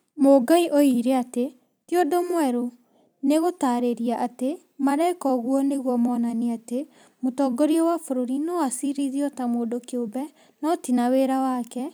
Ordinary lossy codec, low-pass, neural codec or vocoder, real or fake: none; none; vocoder, 44.1 kHz, 128 mel bands every 256 samples, BigVGAN v2; fake